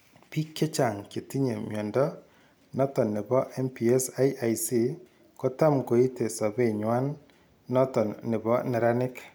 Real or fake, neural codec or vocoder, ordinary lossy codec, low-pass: real; none; none; none